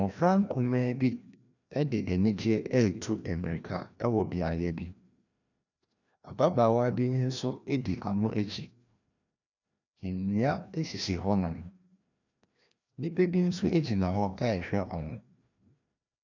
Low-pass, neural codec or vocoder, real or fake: 7.2 kHz; codec, 16 kHz, 1 kbps, FreqCodec, larger model; fake